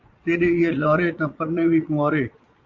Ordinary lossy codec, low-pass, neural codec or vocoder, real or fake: Opus, 32 kbps; 7.2 kHz; vocoder, 22.05 kHz, 80 mel bands, Vocos; fake